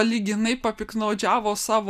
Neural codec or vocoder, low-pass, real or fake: none; 14.4 kHz; real